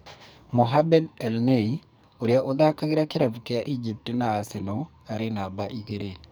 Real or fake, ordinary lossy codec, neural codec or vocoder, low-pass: fake; none; codec, 44.1 kHz, 2.6 kbps, SNAC; none